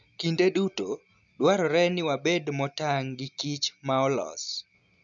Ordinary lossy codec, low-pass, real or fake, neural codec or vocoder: none; 7.2 kHz; real; none